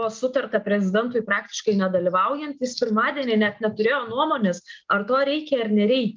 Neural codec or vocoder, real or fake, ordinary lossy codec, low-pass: none; real; Opus, 24 kbps; 7.2 kHz